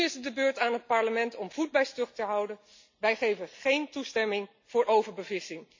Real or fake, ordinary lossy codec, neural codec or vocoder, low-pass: real; MP3, 48 kbps; none; 7.2 kHz